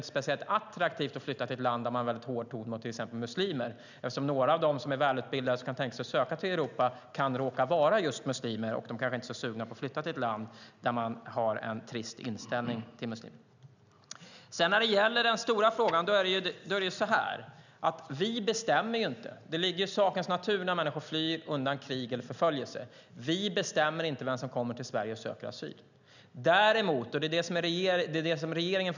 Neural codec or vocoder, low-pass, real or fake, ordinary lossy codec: none; 7.2 kHz; real; none